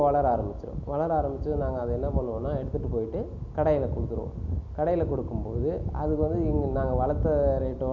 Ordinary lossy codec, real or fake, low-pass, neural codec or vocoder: none; real; 7.2 kHz; none